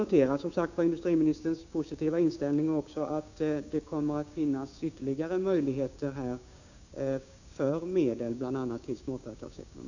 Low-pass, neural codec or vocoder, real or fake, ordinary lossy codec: 7.2 kHz; codec, 16 kHz, 6 kbps, DAC; fake; none